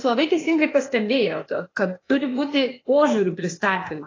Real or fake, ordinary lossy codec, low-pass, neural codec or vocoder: fake; AAC, 32 kbps; 7.2 kHz; codec, 16 kHz, 0.8 kbps, ZipCodec